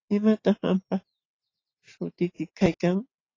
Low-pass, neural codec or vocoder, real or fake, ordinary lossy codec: 7.2 kHz; none; real; AAC, 32 kbps